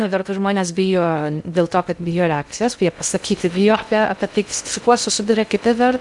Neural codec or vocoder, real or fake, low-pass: codec, 16 kHz in and 24 kHz out, 0.6 kbps, FocalCodec, streaming, 2048 codes; fake; 10.8 kHz